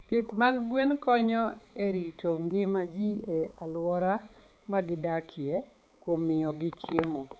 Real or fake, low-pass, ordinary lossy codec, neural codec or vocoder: fake; none; none; codec, 16 kHz, 4 kbps, X-Codec, HuBERT features, trained on balanced general audio